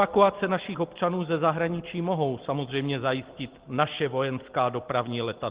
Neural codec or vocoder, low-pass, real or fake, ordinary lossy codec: none; 3.6 kHz; real; Opus, 16 kbps